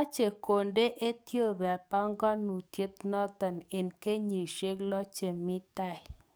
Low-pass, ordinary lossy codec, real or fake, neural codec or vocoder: none; none; fake; codec, 44.1 kHz, 7.8 kbps, DAC